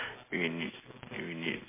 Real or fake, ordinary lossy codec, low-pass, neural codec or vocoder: real; MP3, 16 kbps; 3.6 kHz; none